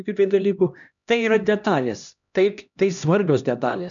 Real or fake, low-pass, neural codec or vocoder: fake; 7.2 kHz; codec, 16 kHz, 1 kbps, X-Codec, HuBERT features, trained on LibriSpeech